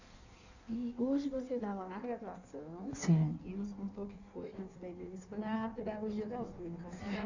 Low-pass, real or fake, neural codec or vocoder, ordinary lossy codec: 7.2 kHz; fake; codec, 16 kHz in and 24 kHz out, 1.1 kbps, FireRedTTS-2 codec; none